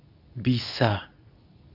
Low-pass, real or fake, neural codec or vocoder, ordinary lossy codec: 5.4 kHz; fake; codec, 24 kHz, 0.9 kbps, WavTokenizer, medium speech release version 2; AAC, 32 kbps